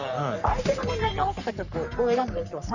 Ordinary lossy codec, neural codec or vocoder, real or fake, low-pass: none; codec, 44.1 kHz, 3.4 kbps, Pupu-Codec; fake; 7.2 kHz